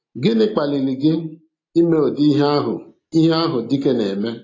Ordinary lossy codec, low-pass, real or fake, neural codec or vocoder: AAC, 32 kbps; 7.2 kHz; real; none